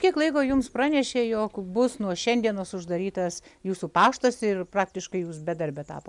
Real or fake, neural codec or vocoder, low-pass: real; none; 10.8 kHz